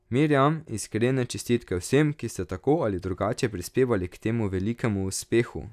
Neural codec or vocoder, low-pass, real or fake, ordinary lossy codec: none; 14.4 kHz; real; none